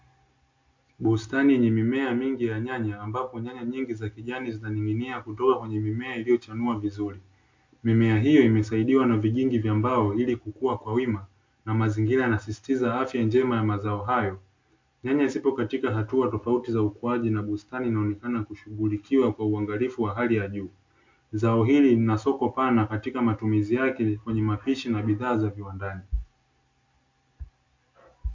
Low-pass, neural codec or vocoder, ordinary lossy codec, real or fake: 7.2 kHz; none; MP3, 48 kbps; real